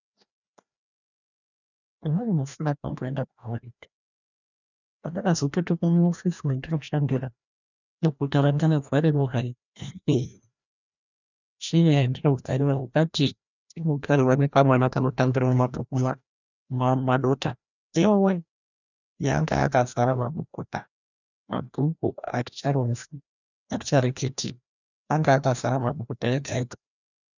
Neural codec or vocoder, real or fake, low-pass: codec, 16 kHz, 1 kbps, FreqCodec, larger model; fake; 7.2 kHz